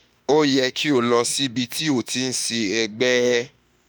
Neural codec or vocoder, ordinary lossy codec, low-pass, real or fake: autoencoder, 48 kHz, 32 numbers a frame, DAC-VAE, trained on Japanese speech; none; none; fake